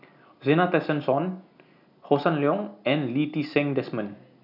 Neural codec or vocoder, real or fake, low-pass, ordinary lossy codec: none; real; 5.4 kHz; none